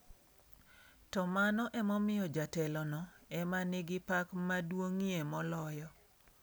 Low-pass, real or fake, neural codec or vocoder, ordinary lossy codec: none; real; none; none